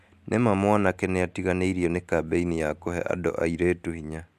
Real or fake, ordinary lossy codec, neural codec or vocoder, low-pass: real; MP3, 96 kbps; none; 14.4 kHz